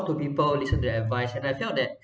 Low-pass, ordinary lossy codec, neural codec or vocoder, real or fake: none; none; none; real